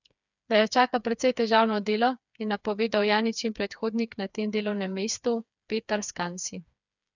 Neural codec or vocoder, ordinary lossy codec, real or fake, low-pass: codec, 16 kHz, 4 kbps, FreqCodec, smaller model; none; fake; 7.2 kHz